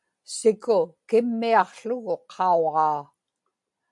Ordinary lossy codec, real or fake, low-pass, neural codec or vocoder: MP3, 64 kbps; real; 10.8 kHz; none